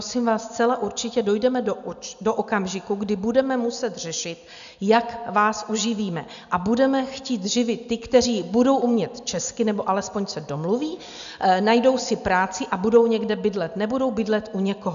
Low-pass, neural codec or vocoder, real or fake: 7.2 kHz; none; real